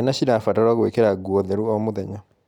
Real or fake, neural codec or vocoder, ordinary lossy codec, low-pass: real; none; none; 19.8 kHz